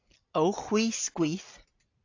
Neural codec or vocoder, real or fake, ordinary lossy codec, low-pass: none; real; AAC, 48 kbps; 7.2 kHz